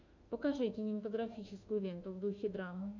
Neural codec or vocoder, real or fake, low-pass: autoencoder, 48 kHz, 32 numbers a frame, DAC-VAE, trained on Japanese speech; fake; 7.2 kHz